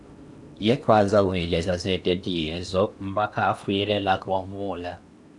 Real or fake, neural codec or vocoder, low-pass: fake; codec, 16 kHz in and 24 kHz out, 0.8 kbps, FocalCodec, streaming, 65536 codes; 10.8 kHz